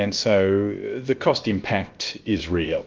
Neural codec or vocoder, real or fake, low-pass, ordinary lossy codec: codec, 16 kHz, about 1 kbps, DyCAST, with the encoder's durations; fake; 7.2 kHz; Opus, 32 kbps